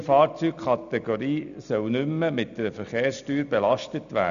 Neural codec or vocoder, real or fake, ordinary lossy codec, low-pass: none; real; none; 7.2 kHz